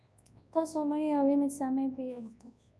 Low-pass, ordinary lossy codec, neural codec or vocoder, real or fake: none; none; codec, 24 kHz, 0.9 kbps, WavTokenizer, large speech release; fake